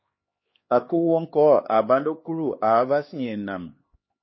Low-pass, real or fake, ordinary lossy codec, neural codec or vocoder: 7.2 kHz; fake; MP3, 24 kbps; codec, 16 kHz, 2 kbps, X-Codec, HuBERT features, trained on LibriSpeech